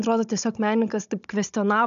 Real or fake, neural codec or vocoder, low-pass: fake; codec, 16 kHz, 16 kbps, FunCodec, trained on Chinese and English, 50 frames a second; 7.2 kHz